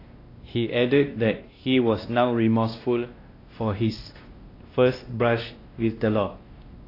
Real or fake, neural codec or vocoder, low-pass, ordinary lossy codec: fake; codec, 16 kHz, 1 kbps, X-Codec, WavLM features, trained on Multilingual LibriSpeech; 5.4 kHz; AAC, 32 kbps